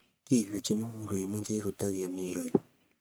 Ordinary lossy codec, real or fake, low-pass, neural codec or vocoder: none; fake; none; codec, 44.1 kHz, 1.7 kbps, Pupu-Codec